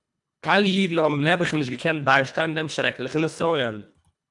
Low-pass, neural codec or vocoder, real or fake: 10.8 kHz; codec, 24 kHz, 1.5 kbps, HILCodec; fake